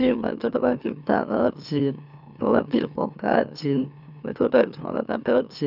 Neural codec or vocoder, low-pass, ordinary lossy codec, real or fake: autoencoder, 44.1 kHz, a latent of 192 numbers a frame, MeloTTS; 5.4 kHz; none; fake